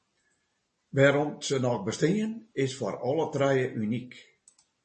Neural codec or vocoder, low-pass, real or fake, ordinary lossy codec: none; 10.8 kHz; real; MP3, 32 kbps